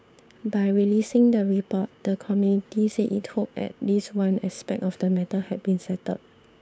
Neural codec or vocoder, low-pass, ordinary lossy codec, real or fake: codec, 16 kHz, 6 kbps, DAC; none; none; fake